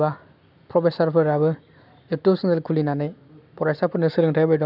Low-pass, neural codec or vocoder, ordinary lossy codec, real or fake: 5.4 kHz; none; none; real